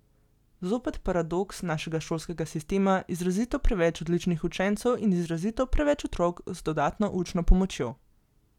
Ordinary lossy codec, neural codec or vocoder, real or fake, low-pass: none; none; real; 19.8 kHz